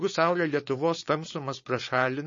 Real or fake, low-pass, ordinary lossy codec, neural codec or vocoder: fake; 7.2 kHz; MP3, 32 kbps; codec, 16 kHz, 4.8 kbps, FACodec